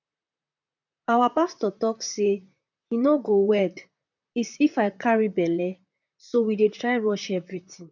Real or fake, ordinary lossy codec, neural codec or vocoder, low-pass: fake; none; vocoder, 44.1 kHz, 128 mel bands, Pupu-Vocoder; 7.2 kHz